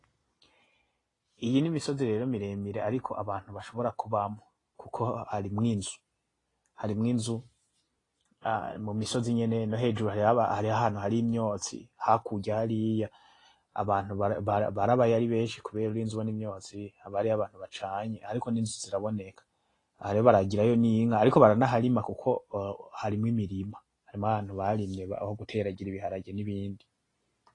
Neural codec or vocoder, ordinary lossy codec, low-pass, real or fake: none; AAC, 32 kbps; 10.8 kHz; real